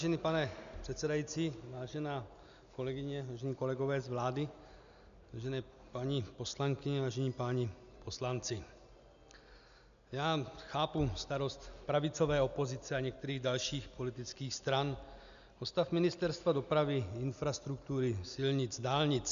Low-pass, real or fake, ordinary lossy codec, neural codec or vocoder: 7.2 kHz; real; MP3, 96 kbps; none